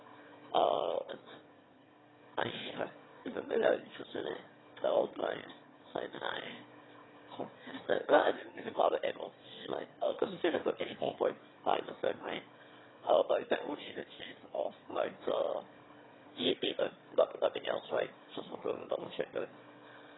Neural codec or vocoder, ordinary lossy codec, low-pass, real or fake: autoencoder, 22.05 kHz, a latent of 192 numbers a frame, VITS, trained on one speaker; AAC, 16 kbps; 7.2 kHz; fake